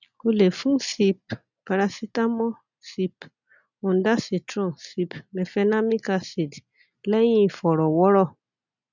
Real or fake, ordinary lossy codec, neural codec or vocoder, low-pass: real; none; none; 7.2 kHz